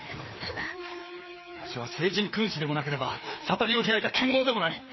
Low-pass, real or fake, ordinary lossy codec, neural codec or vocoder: 7.2 kHz; fake; MP3, 24 kbps; codec, 16 kHz, 2 kbps, FreqCodec, larger model